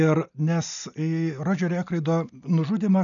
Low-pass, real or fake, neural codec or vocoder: 7.2 kHz; real; none